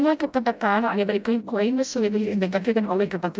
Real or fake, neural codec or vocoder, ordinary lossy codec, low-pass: fake; codec, 16 kHz, 0.5 kbps, FreqCodec, smaller model; none; none